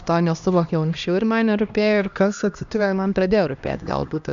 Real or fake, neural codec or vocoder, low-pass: fake; codec, 16 kHz, 1 kbps, X-Codec, HuBERT features, trained on LibriSpeech; 7.2 kHz